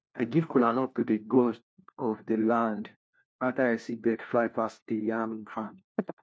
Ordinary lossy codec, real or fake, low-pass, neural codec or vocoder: none; fake; none; codec, 16 kHz, 1 kbps, FunCodec, trained on LibriTTS, 50 frames a second